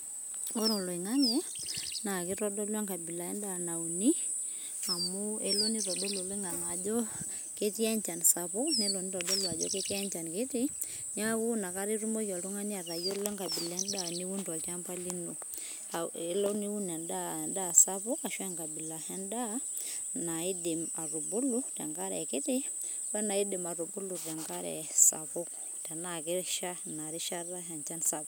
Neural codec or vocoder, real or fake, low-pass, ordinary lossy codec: none; real; none; none